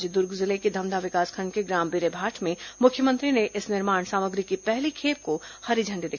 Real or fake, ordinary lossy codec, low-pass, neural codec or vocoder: real; none; 7.2 kHz; none